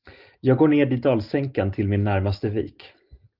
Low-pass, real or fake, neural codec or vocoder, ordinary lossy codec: 5.4 kHz; real; none; Opus, 32 kbps